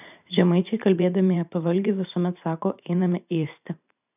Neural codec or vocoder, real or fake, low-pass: vocoder, 44.1 kHz, 128 mel bands every 512 samples, BigVGAN v2; fake; 3.6 kHz